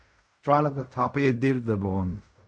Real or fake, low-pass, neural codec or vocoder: fake; 9.9 kHz; codec, 16 kHz in and 24 kHz out, 0.4 kbps, LongCat-Audio-Codec, fine tuned four codebook decoder